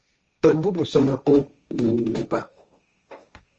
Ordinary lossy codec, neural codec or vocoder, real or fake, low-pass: Opus, 24 kbps; codec, 16 kHz, 1.1 kbps, Voila-Tokenizer; fake; 7.2 kHz